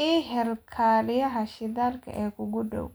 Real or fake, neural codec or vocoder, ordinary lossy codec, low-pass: real; none; none; none